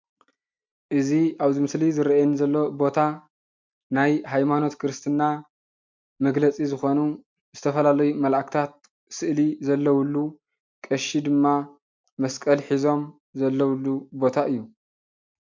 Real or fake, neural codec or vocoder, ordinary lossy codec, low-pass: real; none; MP3, 64 kbps; 7.2 kHz